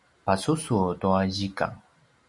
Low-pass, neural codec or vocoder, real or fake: 10.8 kHz; none; real